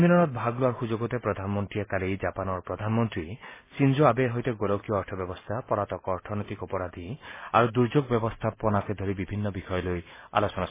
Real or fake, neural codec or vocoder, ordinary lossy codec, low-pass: real; none; MP3, 16 kbps; 3.6 kHz